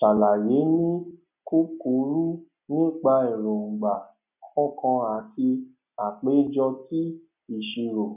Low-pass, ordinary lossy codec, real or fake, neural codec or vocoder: 3.6 kHz; none; real; none